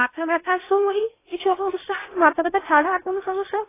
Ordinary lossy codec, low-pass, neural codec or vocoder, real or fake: AAC, 16 kbps; 3.6 kHz; codec, 16 kHz in and 24 kHz out, 0.8 kbps, FocalCodec, streaming, 65536 codes; fake